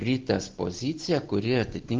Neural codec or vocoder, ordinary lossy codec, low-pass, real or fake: none; Opus, 16 kbps; 7.2 kHz; real